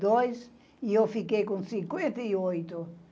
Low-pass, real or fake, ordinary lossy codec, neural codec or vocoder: none; real; none; none